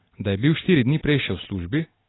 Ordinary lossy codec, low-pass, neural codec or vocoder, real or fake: AAC, 16 kbps; 7.2 kHz; none; real